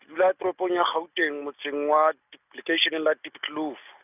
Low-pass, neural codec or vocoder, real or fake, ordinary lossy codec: 3.6 kHz; none; real; none